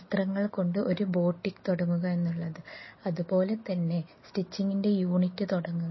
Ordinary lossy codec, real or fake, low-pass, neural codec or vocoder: MP3, 24 kbps; real; 7.2 kHz; none